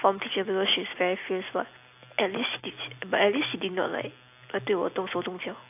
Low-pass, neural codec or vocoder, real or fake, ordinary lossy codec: 3.6 kHz; none; real; AAC, 32 kbps